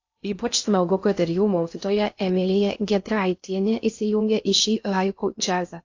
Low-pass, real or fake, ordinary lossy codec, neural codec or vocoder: 7.2 kHz; fake; AAC, 48 kbps; codec, 16 kHz in and 24 kHz out, 0.6 kbps, FocalCodec, streaming, 2048 codes